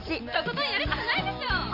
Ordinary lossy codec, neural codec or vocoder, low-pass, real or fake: none; none; 5.4 kHz; real